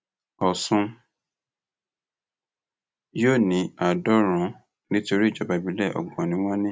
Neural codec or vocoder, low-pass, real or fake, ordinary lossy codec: none; none; real; none